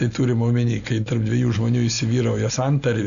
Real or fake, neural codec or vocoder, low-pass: real; none; 7.2 kHz